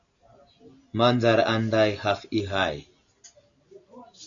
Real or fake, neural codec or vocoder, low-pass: real; none; 7.2 kHz